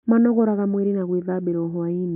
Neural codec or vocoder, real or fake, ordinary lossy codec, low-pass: none; real; none; 3.6 kHz